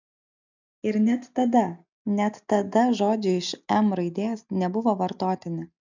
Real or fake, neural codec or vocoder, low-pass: real; none; 7.2 kHz